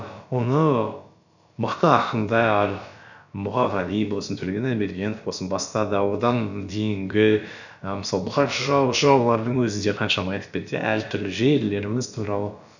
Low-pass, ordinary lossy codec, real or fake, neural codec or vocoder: 7.2 kHz; none; fake; codec, 16 kHz, about 1 kbps, DyCAST, with the encoder's durations